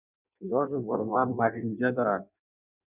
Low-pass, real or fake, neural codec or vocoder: 3.6 kHz; fake; codec, 16 kHz in and 24 kHz out, 0.6 kbps, FireRedTTS-2 codec